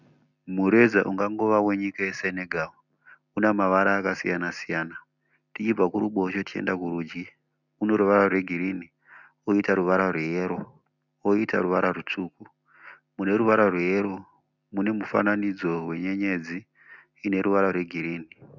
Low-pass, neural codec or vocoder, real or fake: 7.2 kHz; none; real